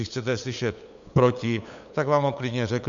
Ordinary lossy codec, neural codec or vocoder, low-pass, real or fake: MP3, 64 kbps; codec, 16 kHz, 8 kbps, FunCodec, trained on LibriTTS, 25 frames a second; 7.2 kHz; fake